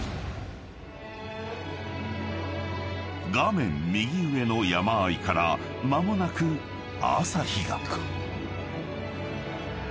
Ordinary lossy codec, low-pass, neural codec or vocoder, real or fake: none; none; none; real